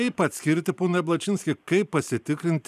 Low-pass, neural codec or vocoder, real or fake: 14.4 kHz; vocoder, 44.1 kHz, 128 mel bands every 512 samples, BigVGAN v2; fake